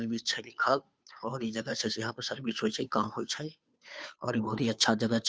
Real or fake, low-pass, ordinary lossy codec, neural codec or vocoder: fake; none; none; codec, 16 kHz, 2 kbps, FunCodec, trained on Chinese and English, 25 frames a second